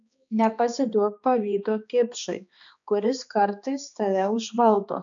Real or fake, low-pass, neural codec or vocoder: fake; 7.2 kHz; codec, 16 kHz, 2 kbps, X-Codec, HuBERT features, trained on balanced general audio